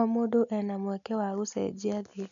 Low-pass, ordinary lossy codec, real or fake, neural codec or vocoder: 7.2 kHz; none; real; none